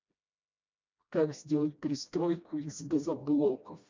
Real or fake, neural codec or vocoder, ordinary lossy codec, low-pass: fake; codec, 16 kHz, 1 kbps, FreqCodec, smaller model; MP3, 64 kbps; 7.2 kHz